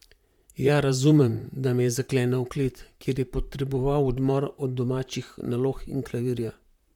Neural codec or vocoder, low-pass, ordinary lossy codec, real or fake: vocoder, 44.1 kHz, 128 mel bands, Pupu-Vocoder; 19.8 kHz; MP3, 96 kbps; fake